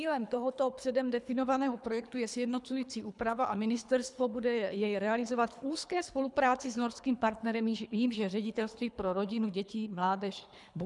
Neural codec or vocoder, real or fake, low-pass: codec, 24 kHz, 3 kbps, HILCodec; fake; 10.8 kHz